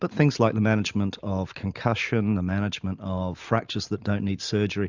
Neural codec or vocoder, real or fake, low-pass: none; real; 7.2 kHz